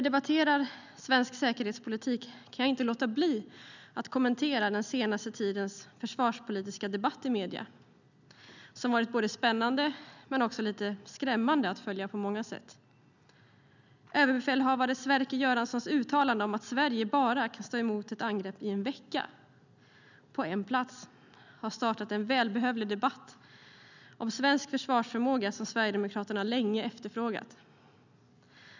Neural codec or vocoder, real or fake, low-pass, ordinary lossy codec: none; real; 7.2 kHz; none